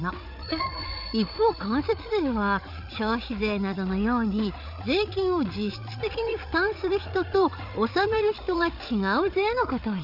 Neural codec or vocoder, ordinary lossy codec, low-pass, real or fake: codec, 16 kHz, 8 kbps, FreqCodec, larger model; none; 5.4 kHz; fake